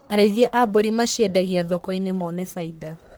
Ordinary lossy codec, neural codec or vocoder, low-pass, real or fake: none; codec, 44.1 kHz, 1.7 kbps, Pupu-Codec; none; fake